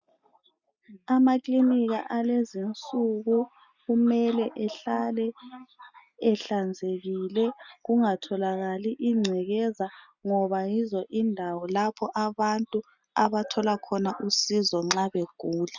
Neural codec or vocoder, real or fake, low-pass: none; real; 7.2 kHz